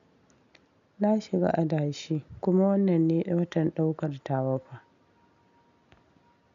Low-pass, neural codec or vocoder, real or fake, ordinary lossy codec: 7.2 kHz; none; real; AAC, 96 kbps